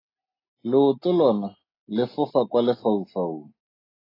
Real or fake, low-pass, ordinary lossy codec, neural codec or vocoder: real; 5.4 kHz; AAC, 24 kbps; none